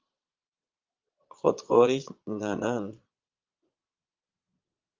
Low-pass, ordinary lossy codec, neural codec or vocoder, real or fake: 7.2 kHz; Opus, 24 kbps; vocoder, 22.05 kHz, 80 mel bands, Vocos; fake